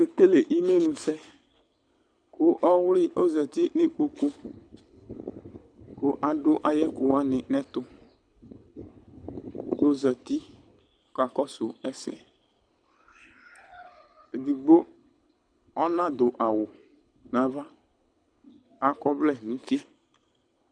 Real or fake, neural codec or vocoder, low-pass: fake; codec, 24 kHz, 6 kbps, HILCodec; 9.9 kHz